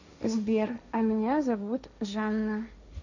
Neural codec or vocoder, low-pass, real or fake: codec, 16 kHz, 1.1 kbps, Voila-Tokenizer; 7.2 kHz; fake